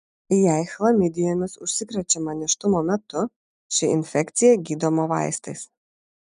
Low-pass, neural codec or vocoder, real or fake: 10.8 kHz; none; real